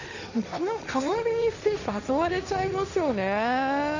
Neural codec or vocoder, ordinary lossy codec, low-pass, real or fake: codec, 16 kHz, 1.1 kbps, Voila-Tokenizer; none; 7.2 kHz; fake